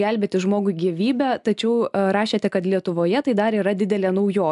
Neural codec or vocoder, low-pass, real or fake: none; 10.8 kHz; real